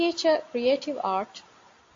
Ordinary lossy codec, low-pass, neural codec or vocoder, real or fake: AAC, 48 kbps; 7.2 kHz; none; real